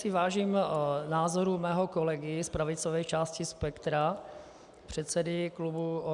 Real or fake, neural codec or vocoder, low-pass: real; none; 10.8 kHz